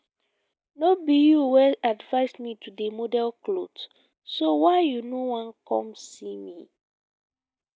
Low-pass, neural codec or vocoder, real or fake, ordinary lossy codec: none; none; real; none